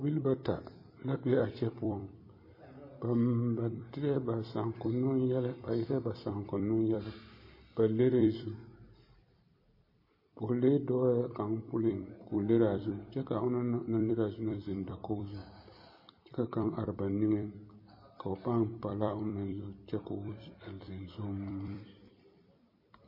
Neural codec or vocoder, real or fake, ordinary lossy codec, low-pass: vocoder, 44.1 kHz, 128 mel bands every 256 samples, BigVGAN v2; fake; MP3, 24 kbps; 5.4 kHz